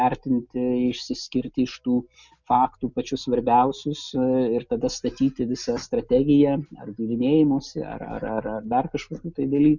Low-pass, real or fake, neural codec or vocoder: 7.2 kHz; real; none